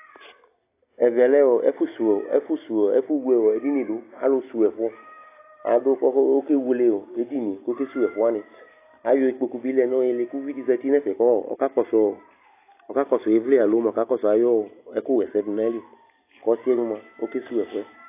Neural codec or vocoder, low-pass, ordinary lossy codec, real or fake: none; 3.6 kHz; AAC, 24 kbps; real